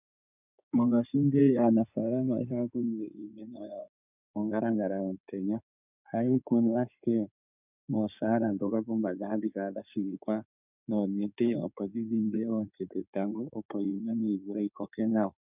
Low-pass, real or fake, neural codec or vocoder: 3.6 kHz; fake; codec, 16 kHz in and 24 kHz out, 2.2 kbps, FireRedTTS-2 codec